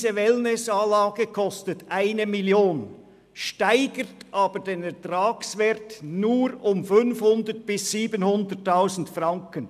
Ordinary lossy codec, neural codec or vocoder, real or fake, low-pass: none; none; real; 14.4 kHz